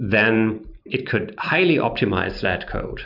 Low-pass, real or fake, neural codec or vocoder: 5.4 kHz; real; none